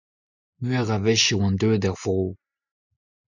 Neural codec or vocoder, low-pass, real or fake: none; 7.2 kHz; real